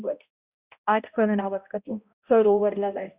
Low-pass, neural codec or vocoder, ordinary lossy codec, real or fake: 3.6 kHz; codec, 16 kHz, 0.5 kbps, X-Codec, HuBERT features, trained on balanced general audio; Opus, 64 kbps; fake